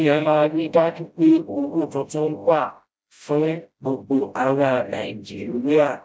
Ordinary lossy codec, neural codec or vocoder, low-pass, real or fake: none; codec, 16 kHz, 0.5 kbps, FreqCodec, smaller model; none; fake